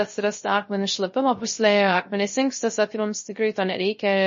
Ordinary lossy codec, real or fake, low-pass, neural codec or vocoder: MP3, 32 kbps; fake; 7.2 kHz; codec, 16 kHz, 0.3 kbps, FocalCodec